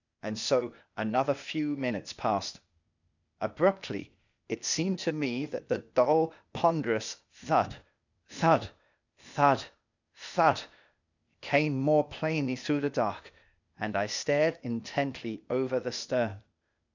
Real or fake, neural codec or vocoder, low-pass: fake; codec, 16 kHz, 0.8 kbps, ZipCodec; 7.2 kHz